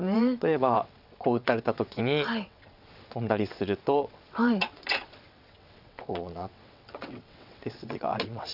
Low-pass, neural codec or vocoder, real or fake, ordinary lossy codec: 5.4 kHz; vocoder, 22.05 kHz, 80 mel bands, WaveNeXt; fake; none